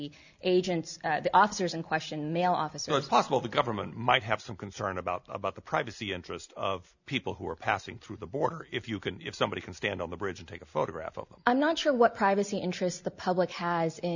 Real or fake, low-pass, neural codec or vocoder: real; 7.2 kHz; none